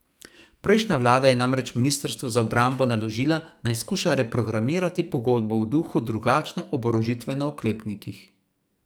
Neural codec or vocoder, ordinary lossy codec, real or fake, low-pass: codec, 44.1 kHz, 2.6 kbps, SNAC; none; fake; none